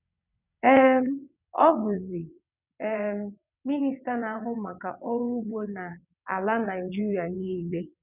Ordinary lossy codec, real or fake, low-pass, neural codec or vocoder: none; fake; 3.6 kHz; vocoder, 22.05 kHz, 80 mel bands, WaveNeXt